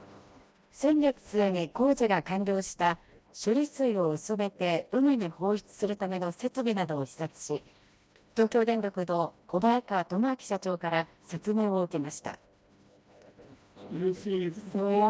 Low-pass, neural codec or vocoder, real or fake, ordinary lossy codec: none; codec, 16 kHz, 1 kbps, FreqCodec, smaller model; fake; none